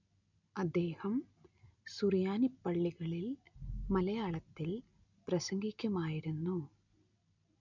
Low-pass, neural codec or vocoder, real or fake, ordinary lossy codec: 7.2 kHz; none; real; none